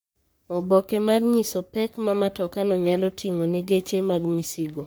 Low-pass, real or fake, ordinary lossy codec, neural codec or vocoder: none; fake; none; codec, 44.1 kHz, 3.4 kbps, Pupu-Codec